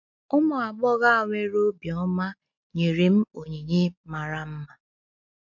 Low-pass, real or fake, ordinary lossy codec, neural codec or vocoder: 7.2 kHz; real; MP3, 48 kbps; none